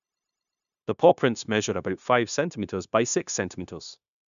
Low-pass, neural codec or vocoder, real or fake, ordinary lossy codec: 7.2 kHz; codec, 16 kHz, 0.9 kbps, LongCat-Audio-Codec; fake; none